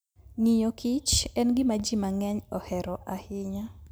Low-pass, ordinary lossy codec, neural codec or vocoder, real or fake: none; none; none; real